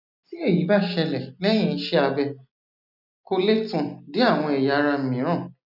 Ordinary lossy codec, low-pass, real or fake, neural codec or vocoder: none; 5.4 kHz; real; none